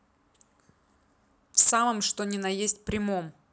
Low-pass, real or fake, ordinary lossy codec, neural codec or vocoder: none; real; none; none